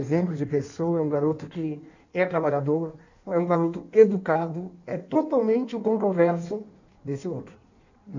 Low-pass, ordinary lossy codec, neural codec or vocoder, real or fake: 7.2 kHz; none; codec, 16 kHz in and 24 kHz out, 1.1 kbps, FireRedTTS-2 codec; fake